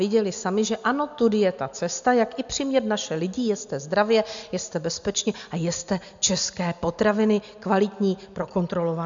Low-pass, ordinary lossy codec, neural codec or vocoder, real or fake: 7.2 kHz; MP3, 64 kbps; none; real